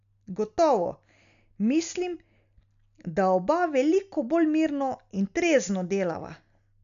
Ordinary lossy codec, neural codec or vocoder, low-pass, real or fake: none; none; 7.2 kHz; real